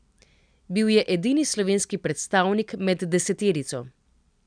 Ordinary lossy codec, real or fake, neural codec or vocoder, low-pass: none; real; none; 9.9 kHz